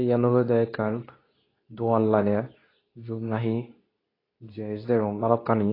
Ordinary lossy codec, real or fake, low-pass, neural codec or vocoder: AAC, 24 kbps; fake; 5.4 kHz; codec, 24 kHz, 0.9 kbps, WavTokenizer, medium speech release version 2